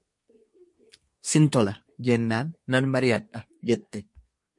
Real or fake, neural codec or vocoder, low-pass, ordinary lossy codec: fake; codec, 24 kHz, 1 kbps, SNAC; 10.8 kHz; MP3, 48 kbps